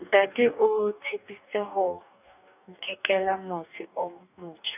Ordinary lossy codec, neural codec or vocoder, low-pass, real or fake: none; codec, 44.1 kHz, 2.6 kbps, DAC; 3.6 kHz; fake